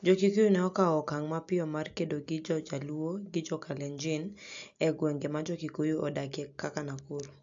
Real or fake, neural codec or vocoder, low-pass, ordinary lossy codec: real; none; 7.2 kHz; AAC, 64 kbps